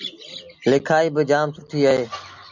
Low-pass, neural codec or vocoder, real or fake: 7.2 kHz; none; real